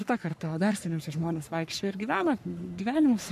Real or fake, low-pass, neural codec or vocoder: fake; 14.4 kHz; codec, 44.1 kHz, 3.4 kbps, Pupu-Codec